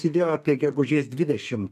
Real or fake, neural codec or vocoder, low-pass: fake; codec, 32 kHz, 1.9 kbps, SNAC; 14.4 kHz